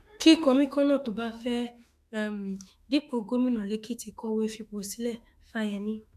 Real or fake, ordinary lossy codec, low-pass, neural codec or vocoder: fake; none; 14.4 kHz; autoencoder, 48 kHz, 32 numbers a frame, DAC-VAE, trained on Japanese speech